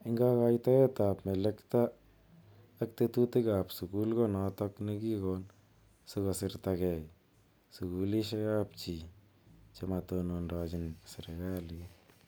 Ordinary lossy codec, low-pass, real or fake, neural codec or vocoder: none; none; real; none